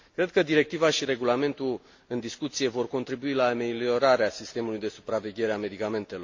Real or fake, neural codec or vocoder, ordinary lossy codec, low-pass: real; none; MP3, 48 kbps; 7.2 kHz